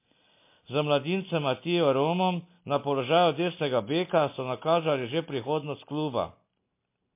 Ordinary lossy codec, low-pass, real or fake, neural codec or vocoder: MP3, 24 kbps; 3.6 kHz; real; none